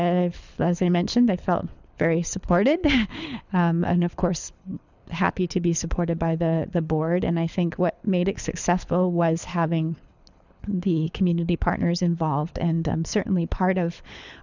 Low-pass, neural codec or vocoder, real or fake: 7.2 kHz; codec, 24 kHz, 6 kbps, HILCodec; fake